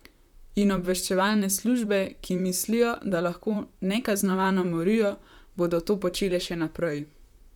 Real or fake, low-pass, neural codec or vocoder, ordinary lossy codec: fake; 19.8 kHz; vocoder, 44.1 kHz, 128 mel bands, Pupu-Vocoder; none